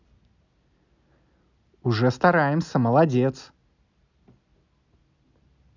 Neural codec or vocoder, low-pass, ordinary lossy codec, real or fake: none; 7.2 kHz; none; real